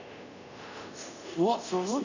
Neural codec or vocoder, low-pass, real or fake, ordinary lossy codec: codec, 24 kHz, 0.5 kbps, DualCodec; 7.2 kHz; fake; none